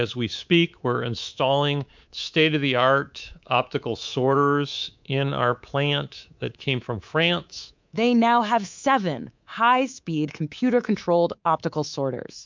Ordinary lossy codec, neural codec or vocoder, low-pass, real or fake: MP3, 64 kbps; codec, 24 kHz, 3.1 kbps, DualCodec; 7.2 kHz; fake